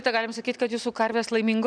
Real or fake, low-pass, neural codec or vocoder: real; 9.9 kHz; none